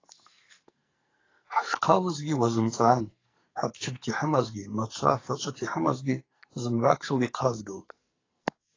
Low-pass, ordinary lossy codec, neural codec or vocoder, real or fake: 7.2 kHz; AAC, 32 kbps; codec, 44.1 kHz, 2.6 kbps, SNAC; fake